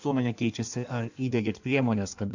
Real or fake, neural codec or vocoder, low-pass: fake; codec, 16 kHz in and 24 kHz out, 1.1 kbps, FireRedTTS-2 codec; 7.2 kHz